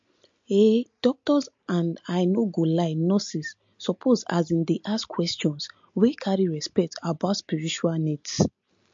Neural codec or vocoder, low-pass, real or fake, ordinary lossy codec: none; 7.2 kHz; real; MP3, 48 kbps